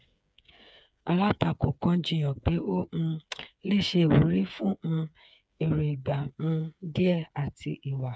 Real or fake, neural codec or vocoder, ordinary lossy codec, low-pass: fake; codec, 16 kHz, 8 kbps, FreqCodec, smaller model; none; none